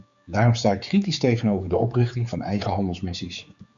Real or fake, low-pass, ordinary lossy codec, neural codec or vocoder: fake; 7.2 kHz; Opus, 64 kbps; codec, 16 kHz, 4 kbps, X-Codec, HuBERT features, trained on general audio